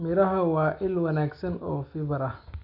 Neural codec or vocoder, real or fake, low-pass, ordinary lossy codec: none; real; 5.4 kHz; none